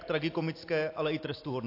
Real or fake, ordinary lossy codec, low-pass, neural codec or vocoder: real; AAC, 32 kbps; 5.4 kHz; none